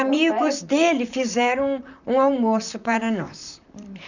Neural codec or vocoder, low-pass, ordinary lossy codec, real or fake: vocoder, 44.1 kHz, 128 mel bands, Pupu-Vocoder; 7.2 kHz; none; fake